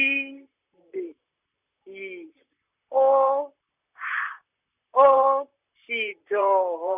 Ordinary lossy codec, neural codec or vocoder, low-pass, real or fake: none; vocoder, 44.1 kHz, 128 mel bands, Pupu-Vocoder; 3.6 kHz; fake